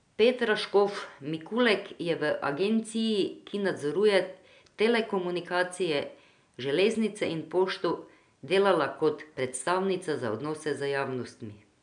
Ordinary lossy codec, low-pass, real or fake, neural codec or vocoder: none; 9.9 kHz; real; none